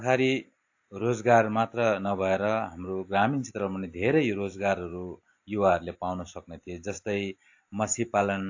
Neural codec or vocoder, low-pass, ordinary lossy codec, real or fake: none; 7.2 kHz; AAC, 48 kbps; real